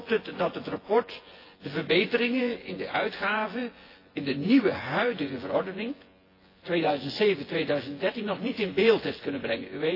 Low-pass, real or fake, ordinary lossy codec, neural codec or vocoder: 5.4 kHz; fake; AAC, 24 kbps; vocoder, 24 kHz, 100 mel bands, Vocos